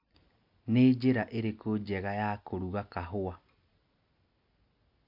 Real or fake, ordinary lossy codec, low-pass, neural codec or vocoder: real; MP3, 48 kbps; 5.4 kHz; none